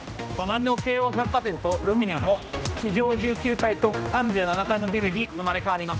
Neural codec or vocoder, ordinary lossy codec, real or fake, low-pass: codec, 16 kHz, 1 kbps, X-Codec, HuBERT features, trained on general audio; none; fake; none